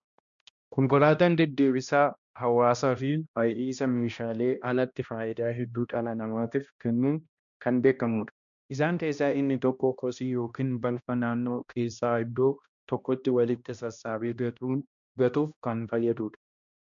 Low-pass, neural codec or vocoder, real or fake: 7.2 kHz; codec, 16 kHz, 1 kbps, X-Codec, HuBERT features, trained on balanced general audio; fake